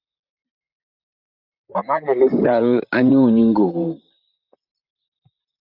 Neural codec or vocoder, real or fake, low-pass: vocoder, 44.1 kHz, 128 mel bands, Pupu-Vocoder; fake; 5.4 kHz